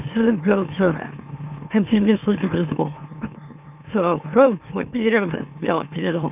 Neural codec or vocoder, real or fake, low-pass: autoencoder, 44.1 kHz, a latent of 192 numbers a frame, MeloTTS; fake; 3.6 kHz